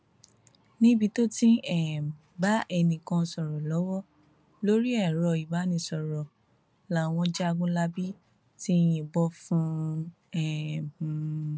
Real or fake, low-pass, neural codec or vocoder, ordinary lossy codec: real; none; none; none